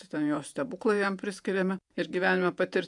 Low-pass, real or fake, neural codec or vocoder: 10.8 kHz; fake; vocoder, 48 kHz, 128 mel bands, Vocos